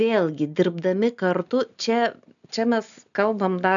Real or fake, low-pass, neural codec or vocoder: real; 7.2 kHz; none